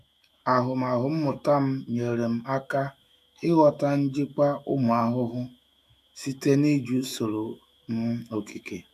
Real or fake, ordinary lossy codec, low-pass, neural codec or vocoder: fake; none; 14.4 kHz; autoencoder, 48 kHz, 128 numbers a frame, DAC-VAE, trained on Japanese speech